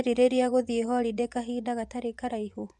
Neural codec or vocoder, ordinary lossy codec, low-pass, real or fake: vocoder, 24 kHz, 100 mel bands, Vocos; none; none; fake